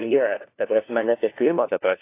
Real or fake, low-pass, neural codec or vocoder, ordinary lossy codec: fake; 3.6 kHz; codec, 16 kHz, 1 kbps, FunCodec, trained on LibriTTS, 50 frames a second; AAC, 24 kbps